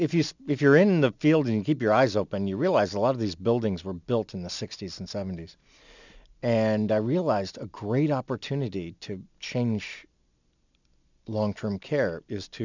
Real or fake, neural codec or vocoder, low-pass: real; none; 7.2 kHz